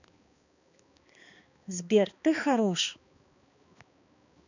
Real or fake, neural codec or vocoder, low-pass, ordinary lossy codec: fake; codec, 16 kHz, 2 kbps, X-Codec, HuBERT features, trained on balanced general audio; 7.2 kHz; none